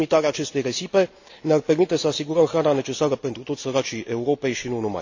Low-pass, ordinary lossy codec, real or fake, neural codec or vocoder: 7.2 kHz; AAC, 48 kbps; real; none